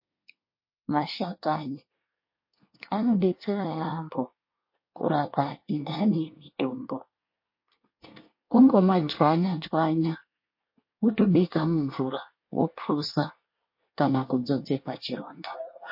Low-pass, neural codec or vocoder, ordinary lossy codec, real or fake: 5.4 kHz; codec, 24 kHz, 1 kbps, SNAC; MP3, 32 kbps; fake